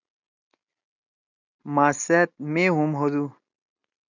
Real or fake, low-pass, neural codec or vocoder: real; 7.2 kHz; none